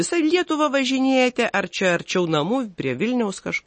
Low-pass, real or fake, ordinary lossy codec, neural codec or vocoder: 10.8 kHz; real; MP3, 32 kbps; none